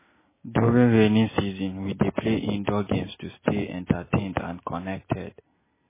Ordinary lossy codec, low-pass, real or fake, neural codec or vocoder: MP3, 16 kbps; 3.6 kHz; real; none